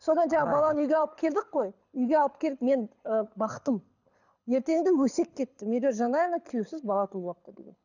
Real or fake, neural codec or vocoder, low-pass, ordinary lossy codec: fake; codec, 24 kHz, 6 kbps, HILCodec; 7.2 kHz; none